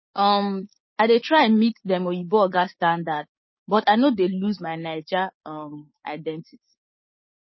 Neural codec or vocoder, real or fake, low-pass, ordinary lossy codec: codec, 16 kHz, 6 kbps, DAC; fake; 7.2 kHz; MP3, 24 kbps